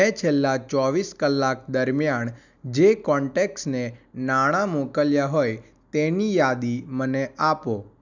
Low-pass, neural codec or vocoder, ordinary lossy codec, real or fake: 7.2 kHz; none; none; real